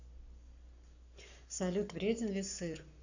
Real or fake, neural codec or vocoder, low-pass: fake; codec, 44.1 kHz, 7.8 kbps, Pupu-Codec; 7.2 kHz